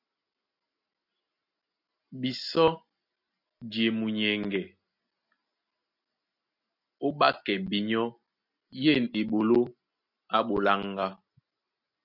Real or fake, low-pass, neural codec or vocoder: real; 5.4 kHz; none